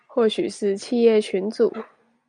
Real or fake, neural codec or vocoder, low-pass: real; none; 9.9 kHz